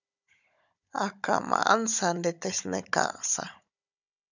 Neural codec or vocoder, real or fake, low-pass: codec, 16 kHz, 16 kbps, FunCodec, trained on Chinese and English, 50 frames a second; fake; 7.2 kHz